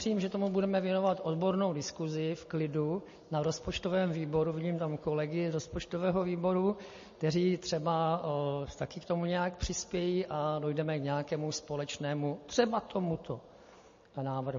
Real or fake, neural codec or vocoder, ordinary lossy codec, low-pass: real; none; MP3, 32 kbps; 7.2 kHz